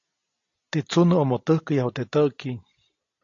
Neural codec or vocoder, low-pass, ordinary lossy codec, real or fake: none; 7.2 kHz; AAC, 48 kbps; real